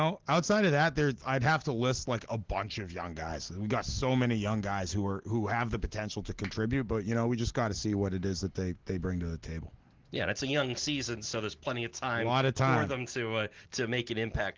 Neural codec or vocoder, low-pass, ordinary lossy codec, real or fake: none; 7.2 kHz; Opus, 16 kbps; real